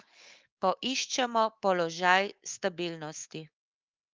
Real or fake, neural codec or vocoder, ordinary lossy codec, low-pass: fake; codec, 24 kHz, 3.1 kbps, DualCodec; Opus, 24 kbps; 7.2 kHz